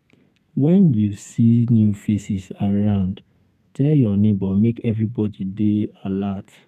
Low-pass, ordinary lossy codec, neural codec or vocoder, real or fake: 14.4 kHz; none; codec, 32 kHz, 1.9 kbps, SNAC; fake